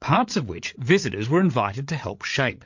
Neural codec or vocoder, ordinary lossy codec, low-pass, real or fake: codec, 16 kHz, 8 kbps, FreqCodec, larger model; MP3, 48 kbps; 7.2 kHz; fake